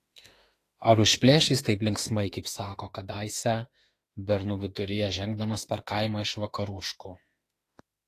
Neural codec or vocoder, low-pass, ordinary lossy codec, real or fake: autoencoder, 48 kHz, 32 numbers a frame, DAC-VAE, trained on Japanese speech; 14.4 kHz; AAC, 48 kbps; fake